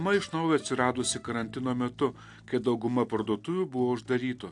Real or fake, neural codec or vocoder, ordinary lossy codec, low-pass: real; none; AAC, 64 kbps; 10.8 kHz